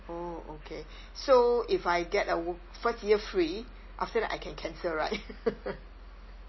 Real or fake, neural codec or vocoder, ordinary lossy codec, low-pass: real; none; MP3, 24 kbps; 7.2 kHz